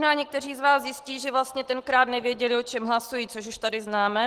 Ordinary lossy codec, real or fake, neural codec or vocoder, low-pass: Opus, 16 kbps; real; none; 14.4 kHz